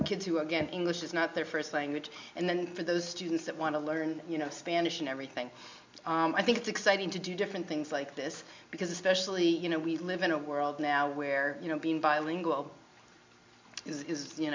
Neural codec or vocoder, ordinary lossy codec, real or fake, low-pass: none; MP3, 64 kbps; real; 7.2 kHz